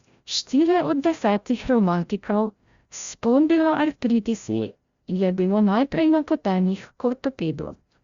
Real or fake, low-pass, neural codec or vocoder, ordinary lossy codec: fake; 7.2 kHz; codec, 16 kHz, 0.5 kbps, FreqCodec, larger model; Opus, 64 kbps